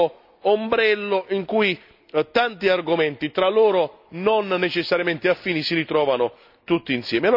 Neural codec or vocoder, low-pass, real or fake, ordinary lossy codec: none; 5.4 kHz; real; none